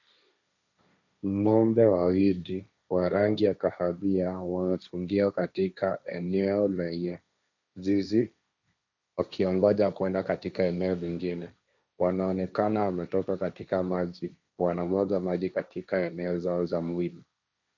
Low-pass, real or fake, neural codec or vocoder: 7.2 kHz; fake; codec, 16 kHz, 1.1 kbps, Voila-Tokenizer